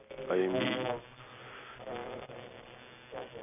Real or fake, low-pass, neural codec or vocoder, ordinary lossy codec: real; 3.6 kHz; none; none